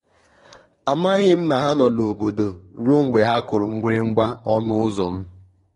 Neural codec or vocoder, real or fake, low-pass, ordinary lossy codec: codec, 24 kHz, 1 kbps, SNAC; fake; 10.8 kHz; AAC, 32 kbps